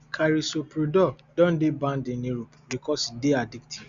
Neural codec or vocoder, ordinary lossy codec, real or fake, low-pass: none; Opus, 64 kbps; real; 7.2 kHz